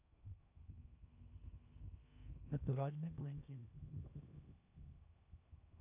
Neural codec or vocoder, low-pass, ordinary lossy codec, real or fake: codec, 16 kHz in and 24 kHz out, 0.8 kbps, FocalCodec, streaming, 65536 codes; 3.6 kHz; none; fake